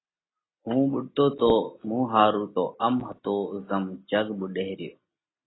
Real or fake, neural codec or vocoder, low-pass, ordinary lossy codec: real; none; 7.2 kHz; AAC, 16 kbps